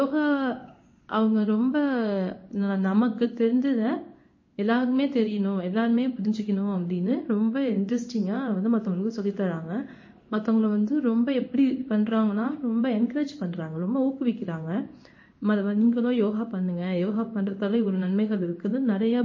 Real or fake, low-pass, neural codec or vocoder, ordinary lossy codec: fake; 7.2 kHz; codec, 16 kHz in and 24 kHz out, 1 kbps, XY-Tokenizer; MP3, 32 kbps